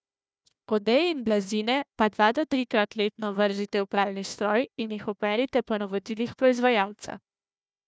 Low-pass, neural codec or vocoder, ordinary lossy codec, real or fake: none; codec, 16 kHz, 1 kbps, FunCodec, trained on Chinese and English, 50 frames a second; none; fake